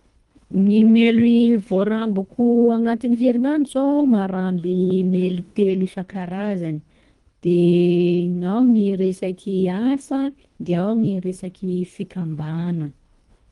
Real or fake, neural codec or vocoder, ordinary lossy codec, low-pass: fake; codec, 24 kHz, 1.5 kbps, HILCodec; Opus, 32 kbps; 10.8 kHz